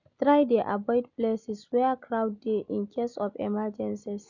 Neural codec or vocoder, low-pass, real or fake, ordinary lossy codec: none; 7.2 kHz; real; none